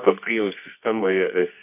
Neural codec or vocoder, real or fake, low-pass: codec, 24 kHz, 0.9 kbps, WavTokenizer, medium music audio release; fake; 3.6 kHz